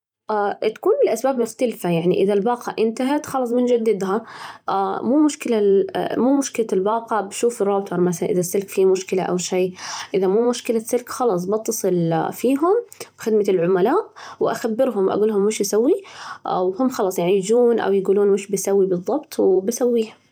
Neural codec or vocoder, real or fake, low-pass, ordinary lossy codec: vocoder, 44.1 kHz, 128 mel bands every 512 samples, BigVGAN v2; fake; 19.8 kHz; none